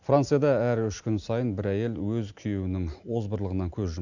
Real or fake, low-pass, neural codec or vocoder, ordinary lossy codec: real; 7.2 kHz; none; none